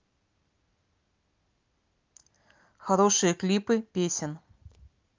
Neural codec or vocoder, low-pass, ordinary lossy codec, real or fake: none; 7.2 kHz; Opus, 32 kbps; real